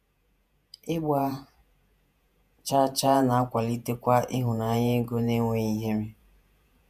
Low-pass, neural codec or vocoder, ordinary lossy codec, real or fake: 14.4 kHz; vocoder, 48 kHz, 128 mel bands, Vocos; none; fake